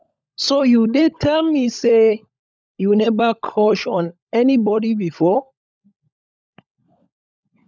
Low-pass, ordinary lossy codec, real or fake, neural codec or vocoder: none; none; fake; codec, 16 kHz, 16 kbps, FunCodec, trained on LibriTTS, 50 frames a second